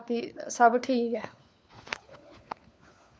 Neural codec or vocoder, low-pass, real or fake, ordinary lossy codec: codec, 16 kHz, 4 kbps, FunCodec, trained on LibriTTS, 50 frames a second; none; fake; none